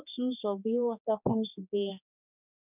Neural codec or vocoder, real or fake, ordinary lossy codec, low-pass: codec, 16 kHz, 2 kbps, X-Codec, HuBERT features, trained on general audio; fake; none; 3.6 kHz